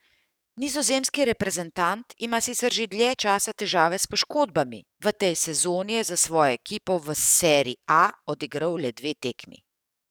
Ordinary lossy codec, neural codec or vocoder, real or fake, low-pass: none; codec, 44.1 kHz, 7.8 kbps, DAC; fake; none